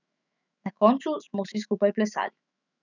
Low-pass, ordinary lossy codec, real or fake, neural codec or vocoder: 7.2 kHz; none; fake; autoencoder, 48 kHz, 128 numbers a frame, DAC-VAE, trained on Japanese speech